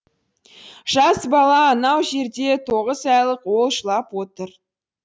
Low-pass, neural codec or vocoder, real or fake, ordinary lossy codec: none; none; real; none